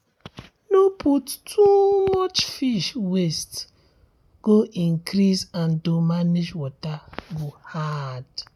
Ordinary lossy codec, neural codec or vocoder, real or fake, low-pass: none; none; real; 19.8 kHz